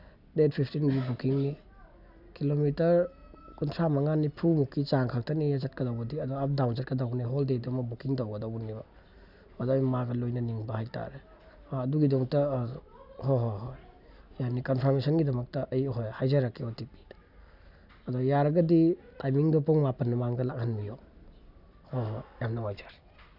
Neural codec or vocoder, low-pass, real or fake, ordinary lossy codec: none; 5.4 kHz; real; none